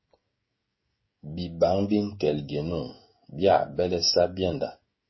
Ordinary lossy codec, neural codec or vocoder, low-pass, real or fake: MP3, 24 kbps; codec, 16 kHz, 16 kbps, FreqCodec, smaller model; 7.2 kHz; fake